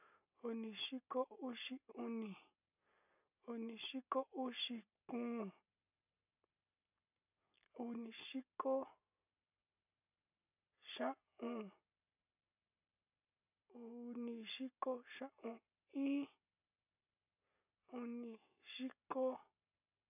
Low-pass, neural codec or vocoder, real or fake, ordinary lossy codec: 3.6 kHz; none; real; none